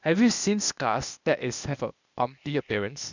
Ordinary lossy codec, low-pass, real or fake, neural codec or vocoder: none; 7.2 kHz; fake; codec, 16 kHz, 0.8 kbps, ZipCodec